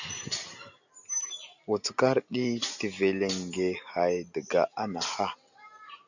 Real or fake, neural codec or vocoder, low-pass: real; none; 7.2 kHz